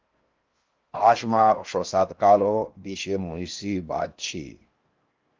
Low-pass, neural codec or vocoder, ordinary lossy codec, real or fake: 7.2 kHz; codec, 16 kHz in and 24 kHz out, 0.6 kbps, FocalCodec, streaming, 4096 codes; Opus, 32 kbps; fake